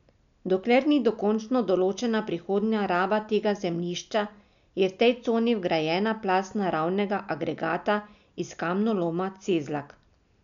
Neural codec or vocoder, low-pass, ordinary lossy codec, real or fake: none; 7.2 kHz; none; real